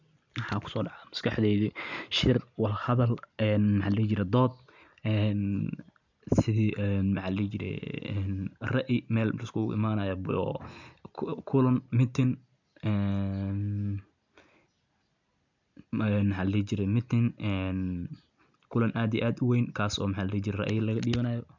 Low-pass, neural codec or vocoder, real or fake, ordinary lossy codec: 7.2 kHz; none; real; none